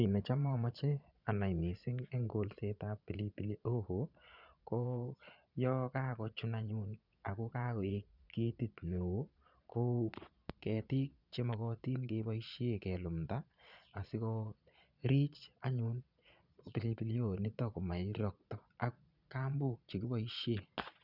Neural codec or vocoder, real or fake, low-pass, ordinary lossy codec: vocoder, 22.05 kHz, 80 mel bands, Vocos; fake; 5.4 kHz; none